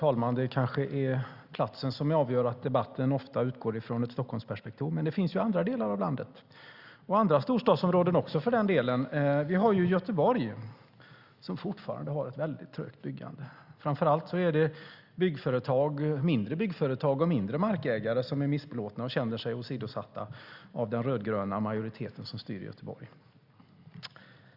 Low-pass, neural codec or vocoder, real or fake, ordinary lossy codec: 5.4 kHz; none; real; Opus, 64 kbps